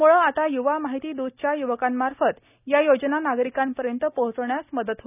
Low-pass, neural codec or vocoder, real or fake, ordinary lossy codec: 3.6 kHz; none; real; none